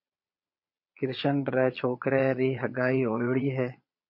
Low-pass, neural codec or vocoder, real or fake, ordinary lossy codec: 5.4 kHz; vocoder, 22.05 kHz, 80 mel bands, Vocos; fake; MP3, 32 kbps